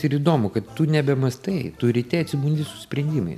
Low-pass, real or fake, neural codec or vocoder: 14.4 kHz; real; none